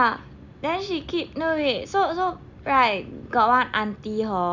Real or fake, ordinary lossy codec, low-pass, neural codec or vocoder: real; none; 7.2 kHz; none